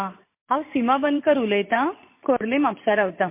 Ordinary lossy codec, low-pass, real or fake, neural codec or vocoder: MP3, 24 kbps; 3.6 kHz; fake; vocoder, 44.1 kHz, 128 mel bands every 512 samples, BigVGAN v2